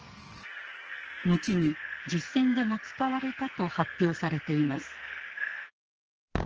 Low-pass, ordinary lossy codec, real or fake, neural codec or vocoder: 7.2 kHz; Opus, 16 kbps; fake; codec, 44.1 kHz, 2.6 kbps, SNAC